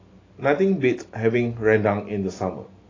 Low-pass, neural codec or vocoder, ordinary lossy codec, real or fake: 7.2 kHz; none; AAC, 32 kbps; real